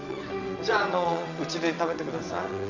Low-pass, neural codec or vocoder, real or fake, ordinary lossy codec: 7.2 kHz; vocoder, 22.05 kHz, 80 mel bands, WaveNeXt; fake; none